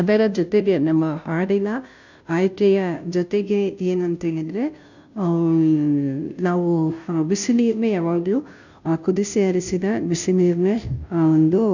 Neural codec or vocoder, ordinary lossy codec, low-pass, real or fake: codec, 16 kHz, 0.5 kbps, FunCodec, trained on Chinese and English, 25 frames a second; none; 7.2 kHz; fake